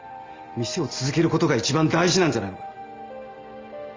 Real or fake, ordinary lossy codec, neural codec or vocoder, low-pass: real; Opus, 32 kbps; none; 7.2 kHz